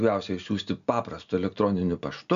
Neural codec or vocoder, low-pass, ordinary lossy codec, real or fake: none; 7.2 kHz; AAC, 96 kbps; real